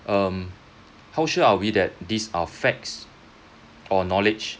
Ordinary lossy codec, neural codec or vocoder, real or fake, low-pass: none; none; real; none